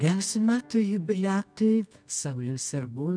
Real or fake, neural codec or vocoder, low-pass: fake; codec, 24 kHz, 0.9 kbps, WavTokenizer, medium music audio release; 9.9 kHz